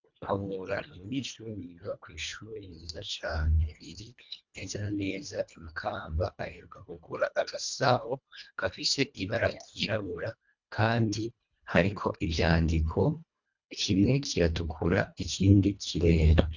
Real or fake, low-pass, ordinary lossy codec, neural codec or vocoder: fake; 7.2 kHz; MP3, 64 kbps; codec, 24 kHz, 1.5 kbps, HILCodec